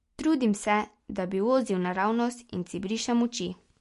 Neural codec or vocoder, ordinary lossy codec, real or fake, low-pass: none; MP3, 48 kbps; real; 14.4 kHz